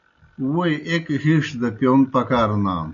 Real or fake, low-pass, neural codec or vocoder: real; 7.2 kHz; none